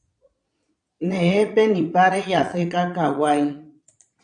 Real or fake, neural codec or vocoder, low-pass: fake; vocoder, 22.05 kHz, 80 mel bands, Vocos; 9.9 kHz